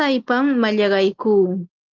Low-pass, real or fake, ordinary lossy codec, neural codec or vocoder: 7.2 kHz; real; Opus, 32 kbps; none